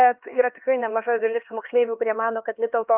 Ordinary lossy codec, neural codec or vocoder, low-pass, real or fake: Opus, 32 kbps; codec, 16 kHz, 2 kbps, X-Codec, HuBERT features, trained on LibriSpeech; 3.6 kHz; fake